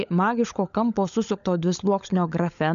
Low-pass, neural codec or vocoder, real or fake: 7.2 kHz; codec, 16 kHz, 16 kbps, FunCodec, trained on LibriTTS, 50 frames a second; fake